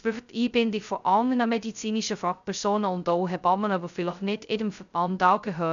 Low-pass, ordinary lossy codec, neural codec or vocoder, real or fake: 7.2 kHz; none; codec, 16 kHz, 0.2 kbps, FocalCodec; fake